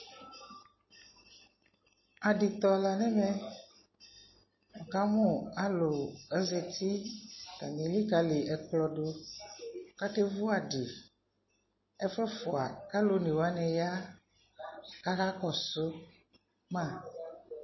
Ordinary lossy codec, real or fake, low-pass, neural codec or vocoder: MP3, 24 kbps; real; 7.2 kHz; none